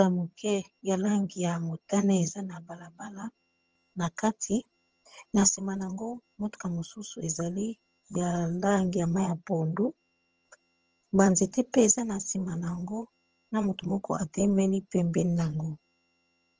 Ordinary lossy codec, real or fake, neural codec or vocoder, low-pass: Opus, 24 kbps; fake; vocoder, 22.05 kHz, 80 mel bands, HiFi-GAN; 7.2 kHz